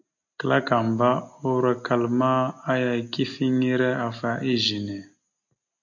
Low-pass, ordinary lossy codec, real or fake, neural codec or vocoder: 7.2 kHz; MP3, 48 kbps; real; none